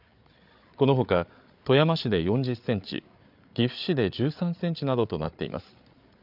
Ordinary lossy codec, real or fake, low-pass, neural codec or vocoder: none; fake; 5.4 kHz; codec, 16 kHz, 8 kbps, FreqCodec, larger model